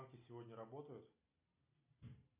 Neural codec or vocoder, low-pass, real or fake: none; 3.6 kHz; real